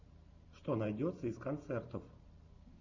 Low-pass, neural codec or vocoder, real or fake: 7.2 kHz; none; real